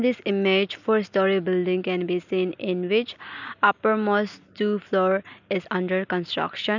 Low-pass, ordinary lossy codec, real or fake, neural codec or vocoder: 7.2 kHz; none; real; none